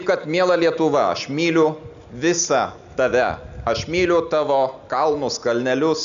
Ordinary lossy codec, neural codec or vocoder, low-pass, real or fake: MP3, 96 kbps; none; 7.2 kHz; real